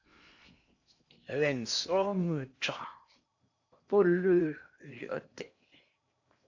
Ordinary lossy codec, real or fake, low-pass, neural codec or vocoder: AAC, 48 kbps; fake; 7.2 kHz; codec, 16 kHz in and 24 kHz out, 0.8 kbps, FocalCodec, streaming, 65536 codes